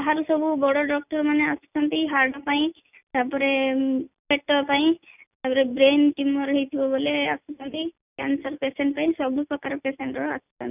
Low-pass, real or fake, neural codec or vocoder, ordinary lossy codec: 3.6 kHz; real; none; none